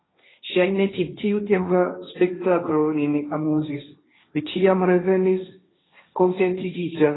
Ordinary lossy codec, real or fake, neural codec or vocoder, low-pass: AAC, 16 kbps; fake; codec, 16 kHz, 1.1 kbps, Voila-Tokenizer; 7.2 kHz